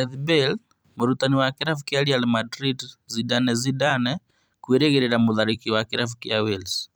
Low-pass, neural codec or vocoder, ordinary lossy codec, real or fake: none; vocoder, 44.1 kHz, 128 mel bands every 256 samples, BigVGAN v2; none; fake